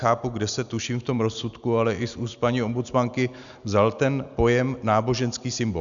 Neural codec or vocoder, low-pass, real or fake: none; 7.2 kHz; real